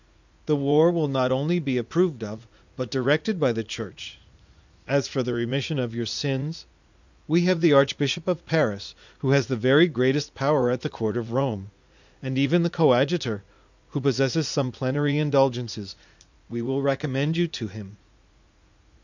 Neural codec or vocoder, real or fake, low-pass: vocoder, 44.1 kHz, 80 mel bands, Vocos; fake; 7.2 kHz